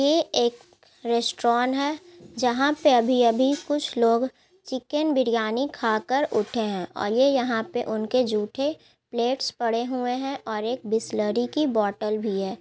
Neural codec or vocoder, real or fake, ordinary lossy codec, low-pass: none; real; none; none